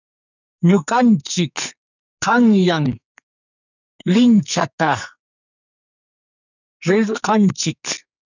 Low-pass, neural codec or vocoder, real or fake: 7.2 kHz; codec, 44.1 kHz, 2.6 kbps, SNAC; fake